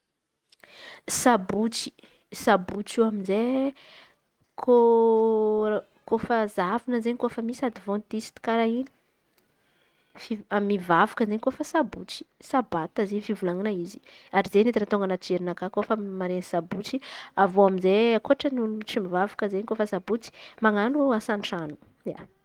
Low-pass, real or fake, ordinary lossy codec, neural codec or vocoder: 19.8 kHz; real; Opus, 24 kbps; none